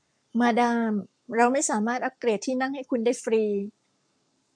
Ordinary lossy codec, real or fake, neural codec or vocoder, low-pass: MP3, 96 kbps; fake; codec, 44.1 kHz, 7.8 kbps, Pupu-Codec; 9.9 kHz